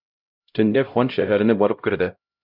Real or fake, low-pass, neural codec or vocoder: fake; 5.4 kHz; codec, 16 kHz, 0.5 kbps, X-Codec, HuBERT features, trained on LibriSpeech